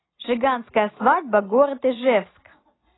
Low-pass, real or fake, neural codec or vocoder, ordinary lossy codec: 7.2 kHz; real; none; AAC, 16 kbps